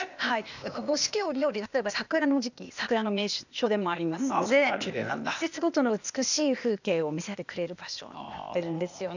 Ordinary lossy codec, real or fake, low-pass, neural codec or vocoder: none; fake; 7.2 kHz; codec, 16 kHz, 0.8 kbps, ZipCodec